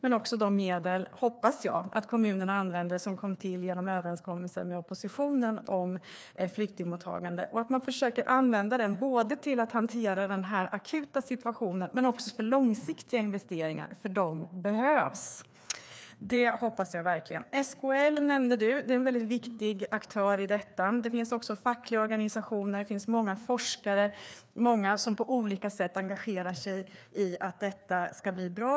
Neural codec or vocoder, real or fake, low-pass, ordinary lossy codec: codec, 16 kHz, 2 kbps, FreqCodec, larger model; fake; none; none